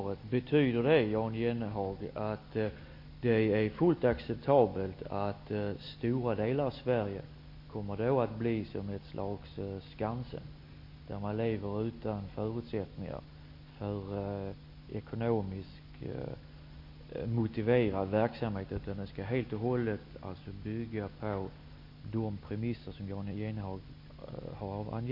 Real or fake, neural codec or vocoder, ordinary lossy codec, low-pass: real; none; MP3, 32 kbps; 5.4 kHz